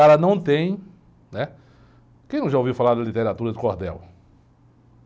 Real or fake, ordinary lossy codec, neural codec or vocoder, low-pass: real; none; none; none